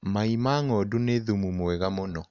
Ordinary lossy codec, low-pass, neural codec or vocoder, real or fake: none; 7.2 kHz; none; real